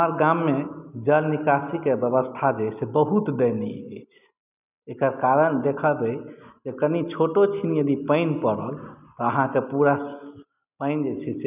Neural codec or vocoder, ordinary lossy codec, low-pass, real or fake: none; none; 3.6 kHz; real